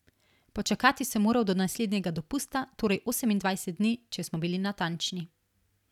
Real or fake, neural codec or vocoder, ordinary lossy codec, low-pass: real; none; none; 19.8 kHz